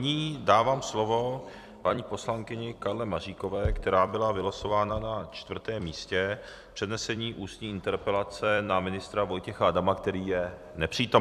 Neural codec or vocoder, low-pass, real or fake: vocoder, 44.1 kHz, 128 mel bands every 256 samples, BigVGAN v2; 14.4 kHz; fake